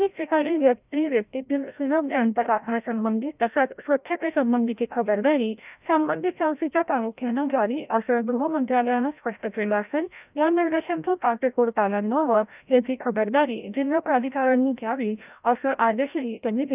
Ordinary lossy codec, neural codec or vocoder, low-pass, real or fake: none; codec, 16 kHz, 0.5 kbps, FreqCodec, larger model; 3.6 kHz; fake